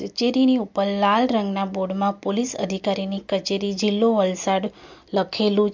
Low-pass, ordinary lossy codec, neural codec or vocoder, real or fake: 7.2 kHz; MP3, 64 kbps; none; real